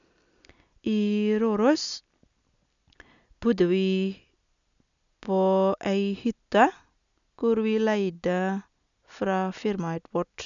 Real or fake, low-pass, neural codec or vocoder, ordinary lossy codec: real; 7.2 kHz; none; none